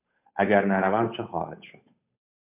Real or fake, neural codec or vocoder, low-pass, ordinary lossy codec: fake; codec, 16 kHz, 8 kbps, FunCodec, trained on Chinese and English, 25 frames a second; 3.6 kHz; MP3, 32 kbps